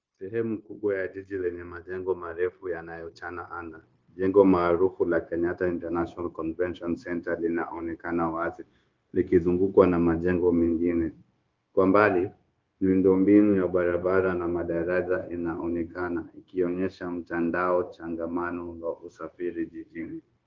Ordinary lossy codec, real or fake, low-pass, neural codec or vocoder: Opus, 32 kbps; fake; 7.2 kHz; codec, 16 kHz, 0.9 kbps, LongCat-Audio-Codec